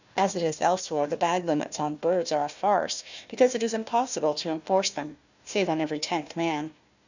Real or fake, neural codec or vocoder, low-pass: fake; codec, 16 kHz, 1 kbps, FunCodec, trained on Chinese and English, 50 frames a second; 7.2 kHz